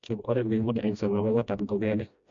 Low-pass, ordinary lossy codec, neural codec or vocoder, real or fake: 7.2 kHz; AAC, 64 kbps; codec, 16 kHz, 1 kbps, FreqCodec, smaller model; fake